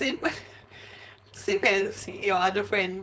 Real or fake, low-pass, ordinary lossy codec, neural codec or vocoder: fake; none; none; codec, 16 kHz, 4.8 kbps, FACodec